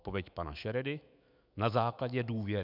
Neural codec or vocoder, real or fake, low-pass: autoencoder, 48 kHz, 128 numbers a frame, DAC-VAE, trained on Japanese speech; fake; 5.4 kHz